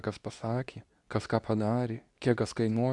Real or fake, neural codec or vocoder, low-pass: fake; codec, 24 kHz, 0.9 kbps, WavTokenizer, medium speech release version 2; 10.8 kHz